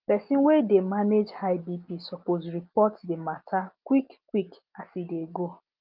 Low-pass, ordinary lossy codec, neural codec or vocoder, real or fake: 5.4 kHz; Opus, 24 kbps; none; real